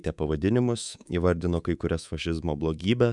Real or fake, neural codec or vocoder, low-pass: fake; codec, 24 kHz, 3.1 kbps, DualCodec; 10.8 kHz